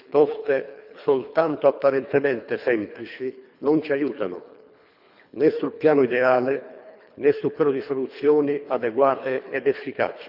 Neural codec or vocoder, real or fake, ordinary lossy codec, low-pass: codec, 24 kHz, 3 kbps, HILCodec; fake; none; 5.4 kHz